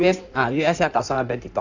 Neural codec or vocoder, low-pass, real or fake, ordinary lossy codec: codec, 16 kHz in and 24 kHz out, 1.1 kbps, FireRedTTS-2 codec; 7.2 kHz; fake; none